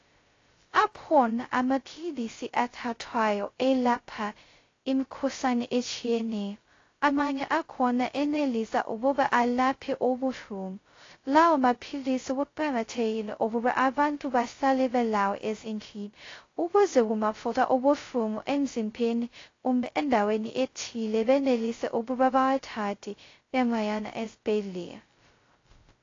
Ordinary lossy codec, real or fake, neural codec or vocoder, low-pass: AAC, 32 kbps; fake; codec, 16 kHz, 0.2 kbps, FocalCodec; 7.2 kHz